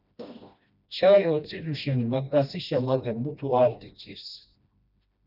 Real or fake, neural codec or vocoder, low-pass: fake; codec, 16 kHz, 1 kbps, FreqCodec, smaller model; 5.4 kHz